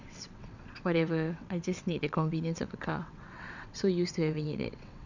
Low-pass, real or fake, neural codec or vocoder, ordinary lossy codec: 7.2 kHz; fake; vocoder, 22.05 kHz, 80 mel bands, WaveNeXt; none